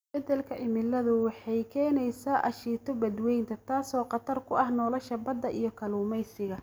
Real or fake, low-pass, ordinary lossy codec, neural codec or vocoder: real; none; none; none